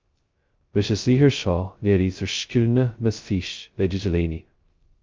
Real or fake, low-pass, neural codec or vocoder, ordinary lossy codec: fake; 7.2 kHz; codec, 16 kHz, 0.2 kbps, FocalCodec; Opus, 24 kbps